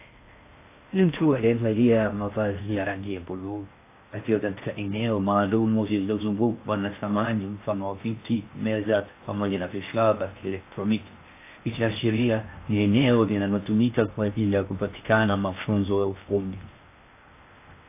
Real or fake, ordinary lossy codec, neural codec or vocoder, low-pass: fake; AAC, 24 kbps; codec, 16 kHz in and 24 kHz out, 0.6 kbps, FocalCodec, streaming, 2048 codes; 3.6 kHz